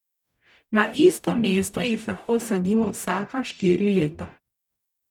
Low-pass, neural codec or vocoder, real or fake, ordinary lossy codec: 19.8 kHz; codec, 44.1 kHz, 0.9 kbps, DAC; fake; none